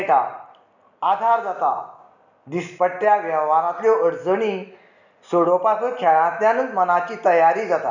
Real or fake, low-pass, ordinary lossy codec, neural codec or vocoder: real; 7.2 kHz; none; none